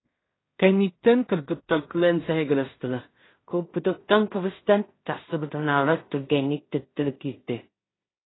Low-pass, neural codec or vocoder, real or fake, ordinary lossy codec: 7.2 kHz; codec, 16 kHz in and 24 kHz out, 0.4 kbps, LongCat-Audio-Codec, two codebook decoder; fake; AAC, 16 kbps